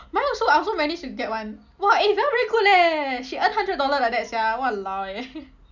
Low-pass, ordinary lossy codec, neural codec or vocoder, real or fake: 7.2 kHz; none; none; real